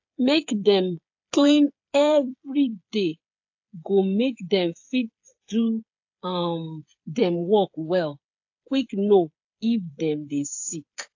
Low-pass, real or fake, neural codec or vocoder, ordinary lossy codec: 7.2 kHz; fake; codec, 16 kHz, 8 kbps, FreqCodec, smaller model; AAC, 48 kbps